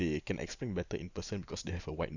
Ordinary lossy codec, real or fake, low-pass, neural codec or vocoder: none; real; 7.2 kHz; none